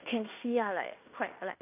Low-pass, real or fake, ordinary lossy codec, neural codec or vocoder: 3.6 kHz; fake; none; codec, 16 kHz in and 24 kHz out, 0.9 kbps, LongCat-Audio-Codec, fine tuned four codebook decoder